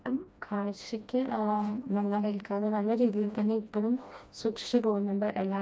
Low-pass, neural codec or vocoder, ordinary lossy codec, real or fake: none; codec, 16 kHz, 1 kbps, FreqCodec, smaller model; none; fake